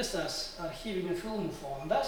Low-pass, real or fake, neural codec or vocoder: 19.8 kHz; fake; vocoder, 44.1 kHz, 128 mel bands every 512 samples, BigVGAN v2